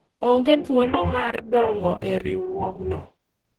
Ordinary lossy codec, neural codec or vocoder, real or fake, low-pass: Opus, 16 kbps; codec, 44.1 kHz, 0.9 kbps, DAC; fake; 19.8 kHz